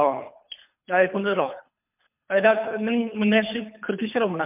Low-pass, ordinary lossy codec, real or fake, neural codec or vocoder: 3.6 kHz; MP3, 32 kbps; fake; codec, 24 kHz, 3 kbps, HILCodec